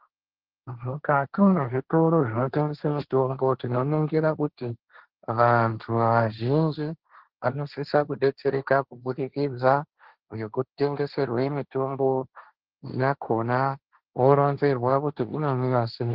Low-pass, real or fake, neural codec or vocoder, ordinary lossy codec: 5.4 kHz; fake; codec, 16 kHz, 1.1 kbps, Voila-Tokenizer; Opus, 16 kbps